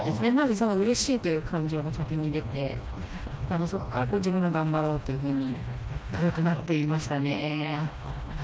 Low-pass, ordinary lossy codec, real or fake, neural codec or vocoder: none; none; fake; codec, 16 kHz, 1 kbps, FreqCodec, smaller model